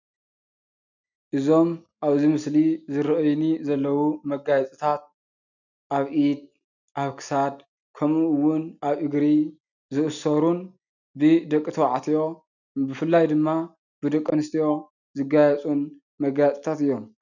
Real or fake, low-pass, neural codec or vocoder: real; 7.2 kHz; none